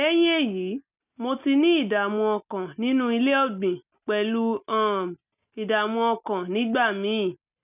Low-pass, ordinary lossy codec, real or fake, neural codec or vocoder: 3.6 kHz; none; real; none